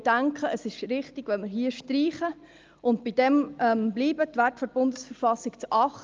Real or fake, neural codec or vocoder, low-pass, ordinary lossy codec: real; none; 7.2 kHz; Opus, 32 kbps